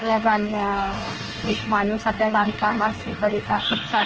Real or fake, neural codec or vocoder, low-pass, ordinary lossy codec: fake; codec, 24 kHz, 1 kbps, SNAC; 7.2 kHz; Opus, 16 kbps